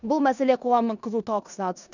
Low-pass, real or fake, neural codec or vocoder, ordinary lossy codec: 7.2 kHz; fake; codec, 16 kHz in and 24 kHz out, 0.9 kbps, LongCat-Audio-Codec, four codebook decoder; none